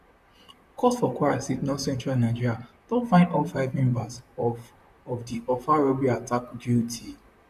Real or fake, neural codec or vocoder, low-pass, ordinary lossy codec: fake; vocoder, 44.1 kHz, 128 mel bands, Pupu-Vocoder; 14.4 kHz; none